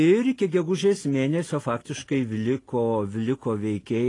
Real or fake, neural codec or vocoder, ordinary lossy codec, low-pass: real; none; AAC, 32 kbps; 10.8 kHz